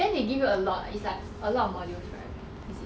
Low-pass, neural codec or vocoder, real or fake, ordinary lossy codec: none; none; real; none